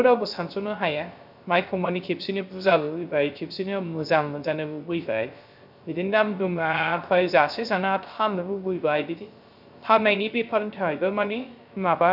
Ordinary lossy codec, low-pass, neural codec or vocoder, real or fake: none; 5.4 kHz; codec, 16 kHz, 0.3 kbps, FocalCodec; fake